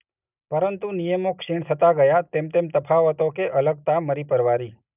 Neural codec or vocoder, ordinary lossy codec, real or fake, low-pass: none; none; real; 3.6 kHz